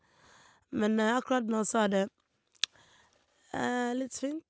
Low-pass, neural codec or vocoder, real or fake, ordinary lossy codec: none; none; real; none